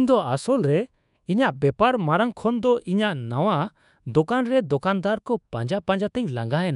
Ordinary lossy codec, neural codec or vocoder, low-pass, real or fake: none; codec, 24 kHz, 1.2 kbps, DualCodec; 10.8 kHz; fake